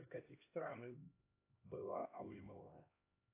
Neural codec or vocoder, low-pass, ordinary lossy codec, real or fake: codec, 16 kHz, 1 kbps, X-Codec, HuBERT features, trained on LibriSpeech; 3.6 kHz; AAC, 24 kbps; fake